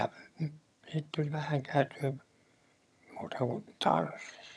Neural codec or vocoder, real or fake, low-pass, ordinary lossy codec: vocoder, 22.05 kHz, 80 mel bands, HiFi-GAN; fake; none; none